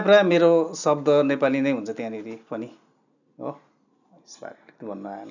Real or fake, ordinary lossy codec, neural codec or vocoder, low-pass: real; none; none; 7.2 kHz